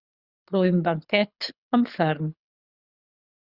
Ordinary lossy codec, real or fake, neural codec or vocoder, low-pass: Opus, 64 kbps; fake; codec, 24 kHz, 6 kbps, HILCodec; 5.4 kHz